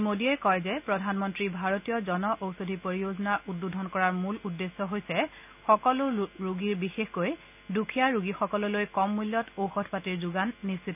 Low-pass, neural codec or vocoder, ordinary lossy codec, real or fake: 3.6 kHz; none; none; real